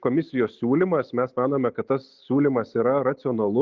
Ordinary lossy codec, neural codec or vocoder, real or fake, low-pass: Opus, 32 kbps; none; real; 7.2 kHz